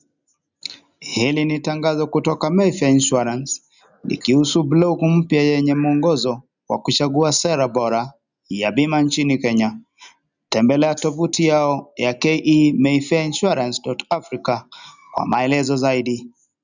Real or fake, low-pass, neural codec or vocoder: real; 7.2 kHz; none